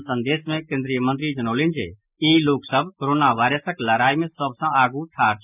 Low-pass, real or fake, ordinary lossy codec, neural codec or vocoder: 3.6 kHz; real; none; none